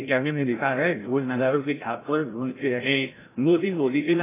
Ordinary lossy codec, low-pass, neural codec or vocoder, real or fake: AAC, 16 kbps; 3.6 kHz; codec, 16 kHz, 0.5 kbps, FreqCodec, larger model; fake